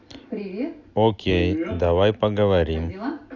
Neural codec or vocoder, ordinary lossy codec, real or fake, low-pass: none; AAC, 48 kbps; real; 7.2 kHz